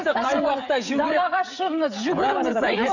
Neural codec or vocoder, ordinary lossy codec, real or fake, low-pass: codec, 16 kHz, 16 kbps, FreqCodec, smaller model; none; fake; 7.2 kHz